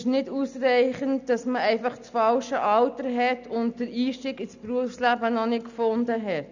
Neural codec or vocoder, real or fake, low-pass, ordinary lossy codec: none; real; 7.2 kHz; none